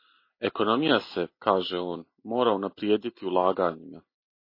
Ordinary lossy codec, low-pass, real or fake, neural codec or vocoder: MP3, 24 kbps; 5.4 kHz; real; none